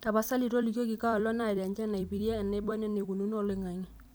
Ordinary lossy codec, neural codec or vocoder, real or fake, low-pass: none; vocoder, 44.1 kHz, 128 mel bands every 256 samples, BigVGAN v2; fake; none